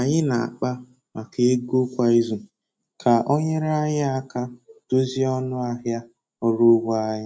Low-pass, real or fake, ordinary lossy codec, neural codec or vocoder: none; real; none; none